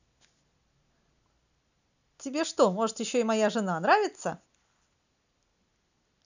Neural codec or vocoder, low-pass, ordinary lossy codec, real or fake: none; 7.2 kHz; none; real